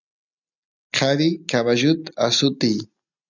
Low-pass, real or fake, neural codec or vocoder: 7.2 kHz; real; none